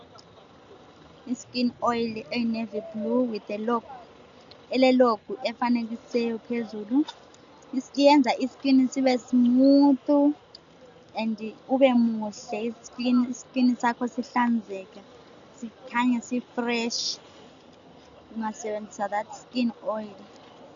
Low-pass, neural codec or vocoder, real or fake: 7.2 kHz; none; real